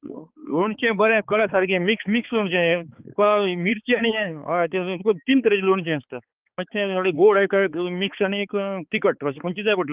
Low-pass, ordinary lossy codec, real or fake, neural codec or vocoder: 3.6 kHz; Opus, 32 kbps; fake; codec, 16 kHz, 4 kbps, X-Codec, HuBERT features, trained on balanced general audio